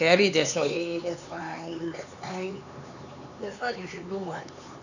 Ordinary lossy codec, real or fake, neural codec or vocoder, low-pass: none; fake; codec, 16 kHz, 4 kbps, X-Codec, HuBERT features, trained on LibriSpeech; 7.2 kHz